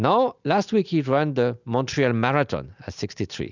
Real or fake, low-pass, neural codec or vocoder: real; 7.2 kHz; none